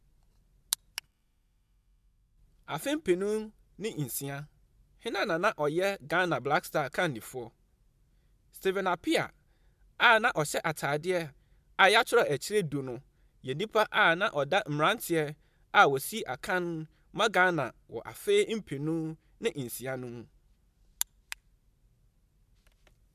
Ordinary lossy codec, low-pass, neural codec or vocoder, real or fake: MP3, 96 kbps; 14.4 kHz; none; real